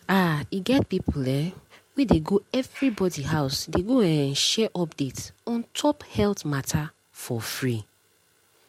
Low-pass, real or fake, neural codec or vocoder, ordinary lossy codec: 19.8 kHz; real; none; MP3, 64 kbps